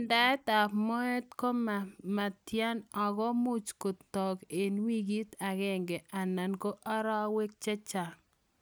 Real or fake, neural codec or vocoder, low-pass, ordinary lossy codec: real; none; none; none